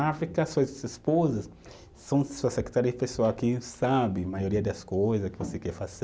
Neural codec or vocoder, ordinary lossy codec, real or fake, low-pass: none; none; real; none